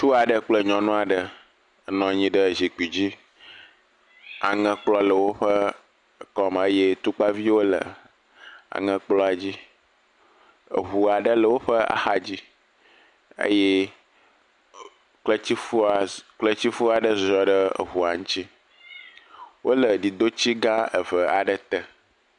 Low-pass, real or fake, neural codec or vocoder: 10.8 kHz; real; none